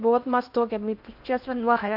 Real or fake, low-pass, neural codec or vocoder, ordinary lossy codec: fake; 5.4 kHz; codec, 16 kHz in and 24 kHz out, 0.6 kbps, FocalCodec, streaming, 4096 codes; MP3, 48 kbps